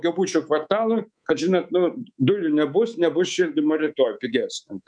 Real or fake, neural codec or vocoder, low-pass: fake; codec, 24 kHz, 3.1 kbps, DualCodec; 9.9 kHz